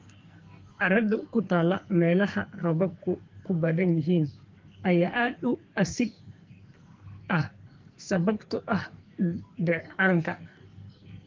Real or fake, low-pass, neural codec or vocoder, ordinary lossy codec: fake; 7.2 kHz; codec, 44.1 kHz, 2.6 kbps, SNAC; Opus, 32 kbps